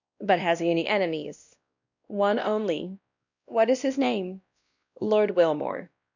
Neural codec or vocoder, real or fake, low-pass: codec, 16 kHz, 1 kbps, X-Codec, WavLM features, trained on Multilingual LibriSpeech; fake; 7.2 kHz